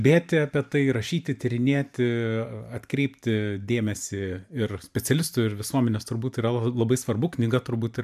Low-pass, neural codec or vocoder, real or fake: 14.4 kHz; vocoder, 44.1 kHz, 128 mel bands every 512 samples, BigVGAN v2; fake